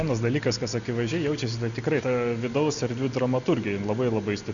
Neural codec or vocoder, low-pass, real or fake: none; 7.2 kHz; real